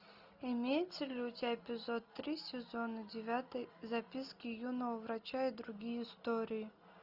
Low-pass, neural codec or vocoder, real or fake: 5.4 kHz; none; real